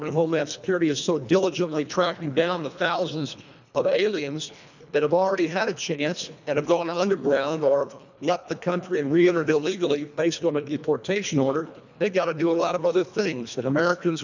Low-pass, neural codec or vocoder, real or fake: 7.2 kHz; codec, 24 kHz, 1.5 kbps, HILCodec; fake